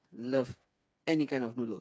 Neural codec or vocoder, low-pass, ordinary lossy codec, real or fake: codec, 16 kHz, 4 kbps, FreqCodec, smaller model; none; none; fake